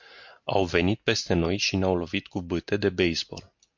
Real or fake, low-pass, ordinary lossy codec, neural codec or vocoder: real; 7.2 kHz; MP3, 48 kbps; none